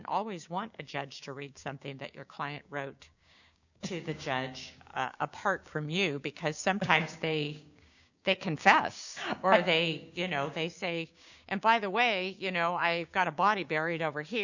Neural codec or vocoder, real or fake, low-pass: codec, 16 kHz, 6 kbps, DAC; fake; 7.2 kHz